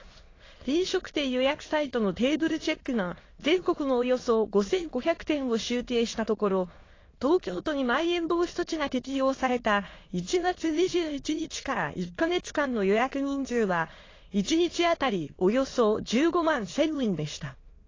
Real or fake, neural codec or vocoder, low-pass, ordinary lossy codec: fake; autoencoder, 22.05 kHz, a latent of 192 numbers a frame, VITS, trained on many speakers; 7.2 kHz; AAC, 32 kbps